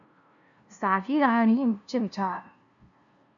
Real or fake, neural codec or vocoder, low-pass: fake; codec, 16 kHz, 0.5 kbps, FunCodec, trained on LibriTTS, 25 frames a second; 7.2 kHz